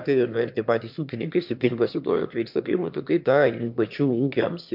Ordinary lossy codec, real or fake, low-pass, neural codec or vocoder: MP3, 48 kbps; fake; 5.4 kHz; autoencoder, 22.05 kHz, a latent of 192 numbers a frame, VITS, trained on one speaker